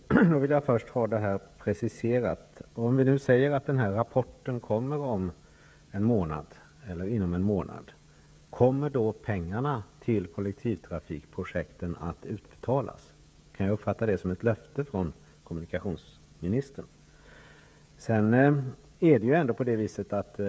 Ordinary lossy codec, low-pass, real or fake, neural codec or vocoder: none; none; fake; codec, 16 kHz, 16 kbps, FreqCodec, smaller model